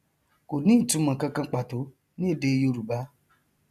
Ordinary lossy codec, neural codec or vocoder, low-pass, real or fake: none; none; 14.4 kHz; real